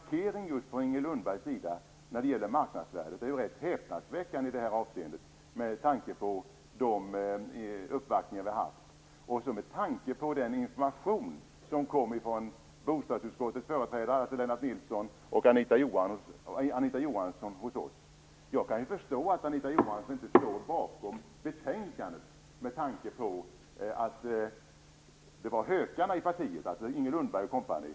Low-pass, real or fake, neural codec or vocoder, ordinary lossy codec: none; real; none; none